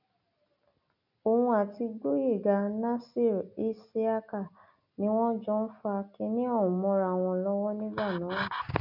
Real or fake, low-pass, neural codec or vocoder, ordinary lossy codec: real; 5.4 kHz; none; none